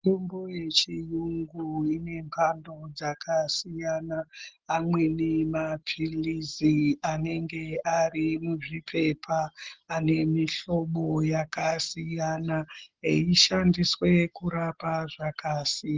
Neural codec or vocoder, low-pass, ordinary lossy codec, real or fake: none; 7.2 kHz; Opus, 16 kbps; real